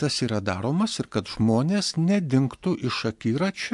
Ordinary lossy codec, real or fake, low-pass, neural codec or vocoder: MP3, 64 kbps; real; 10.8 kHz; none